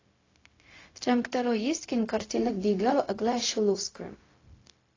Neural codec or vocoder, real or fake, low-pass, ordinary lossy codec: codec, 16 kHz, 0.4 kbps, LongCat-Audio-Codec; fake; 7.2 kHz; AAC, 32 kbps